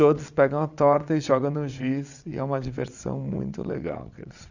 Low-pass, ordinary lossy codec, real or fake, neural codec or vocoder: 7.2 kHz; none; fake; vocoder, 22.05 kHz, 80 mel bands, WaveNeXt